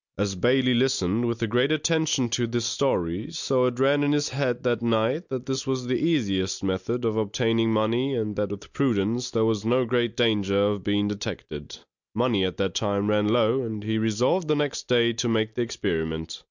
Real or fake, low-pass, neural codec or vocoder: real; 7.2 kHz; none